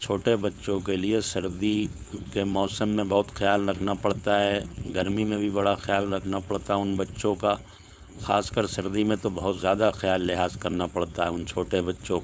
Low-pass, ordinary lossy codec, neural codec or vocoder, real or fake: none; none; codec, 16 kHz, 4.8 kbps, FACodec; fake